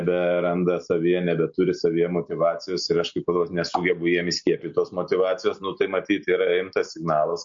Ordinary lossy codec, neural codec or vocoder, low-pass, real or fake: MP3, 48 kbps; none; 7.2 kHz; real